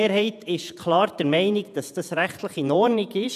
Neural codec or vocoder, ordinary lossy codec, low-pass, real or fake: vocoder, 48 kHz, 128 mel bands, Vocos; none; 14.4 kHz; fake